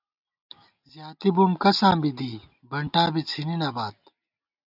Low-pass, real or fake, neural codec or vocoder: 5.4 kHz; real; none